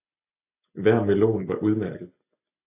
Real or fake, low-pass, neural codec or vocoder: real; 3.6 kHz; none